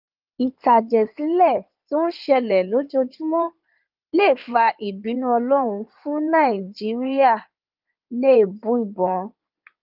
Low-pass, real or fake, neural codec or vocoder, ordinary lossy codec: 5.4 kHz; fake; codec, 16 kHz in and 24 kHz out, 2.2 kbps, FireRedTTS-2 codec; Opus, 24 kbps